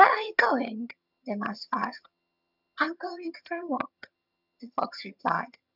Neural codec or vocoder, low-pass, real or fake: vocoder, 22.05 kHz, 80 mel bands, HiFi-GAN; 5.4 kHz; fake